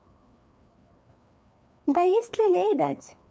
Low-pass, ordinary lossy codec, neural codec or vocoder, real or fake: none; none; codec, 16 kHz, 2 kbps, FreqCodec, larger model; fake